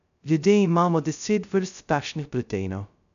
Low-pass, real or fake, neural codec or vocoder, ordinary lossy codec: 7.2 kHz; fake; codec, 16 kHz, 0.2 kbps, FocalCodec; none